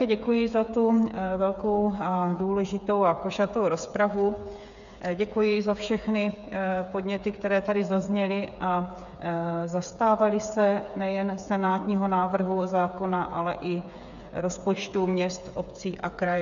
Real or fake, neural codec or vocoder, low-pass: fake; codec, 16 kHz, 8 kbps, FreqCodec, smaller model; 7.2 kHz